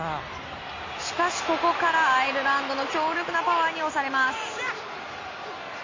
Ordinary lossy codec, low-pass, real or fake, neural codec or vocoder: MP3, 32 kbps; 7.2 kHz; real; none